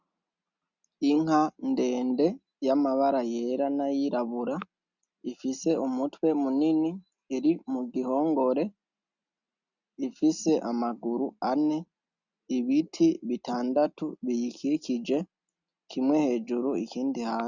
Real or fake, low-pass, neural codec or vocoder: real; 7.2 kHz; none